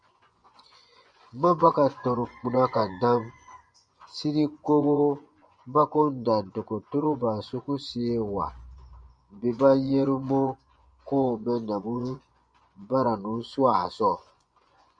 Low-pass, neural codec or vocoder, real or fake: 9.9 kHz; vocoder, 24 kHz, 100 mel bands, Vocos; fake